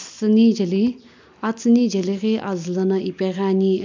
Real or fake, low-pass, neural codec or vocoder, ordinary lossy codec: real; 7.2 kHz; none; MP3, 64 kbps